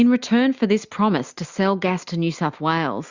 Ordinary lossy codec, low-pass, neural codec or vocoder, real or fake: Opus, 64 kbps; 7.2 kHz; none; real